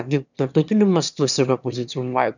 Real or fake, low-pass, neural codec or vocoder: fake; 7.2 kHz; autoencoder, 22.05 kHz, a latent of 192 numbers a frame, VITS, trained on one speaker